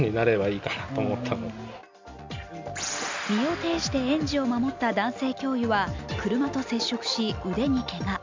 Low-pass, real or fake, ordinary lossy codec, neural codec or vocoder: 7.2 kHz; real; MP3, 64 kbps; none